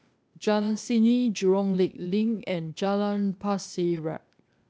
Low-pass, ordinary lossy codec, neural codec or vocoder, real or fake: none; none; codec, 16 kHz, 0.8 kbps, ZipCodec; fake